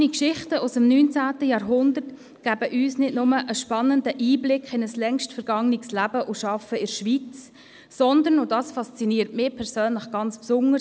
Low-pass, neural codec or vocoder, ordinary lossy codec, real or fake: none; none; none; real